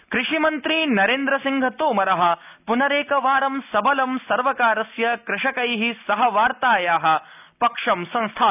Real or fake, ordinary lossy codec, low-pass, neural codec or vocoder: real; none; 3.6 kHz; none